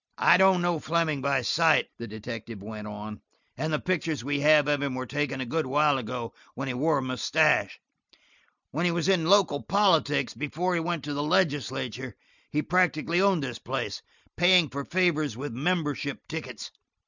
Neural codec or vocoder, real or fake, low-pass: none; real; 7.2 kHz